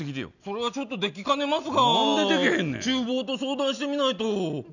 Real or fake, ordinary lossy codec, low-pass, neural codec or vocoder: real; none; 7.2 kHz; none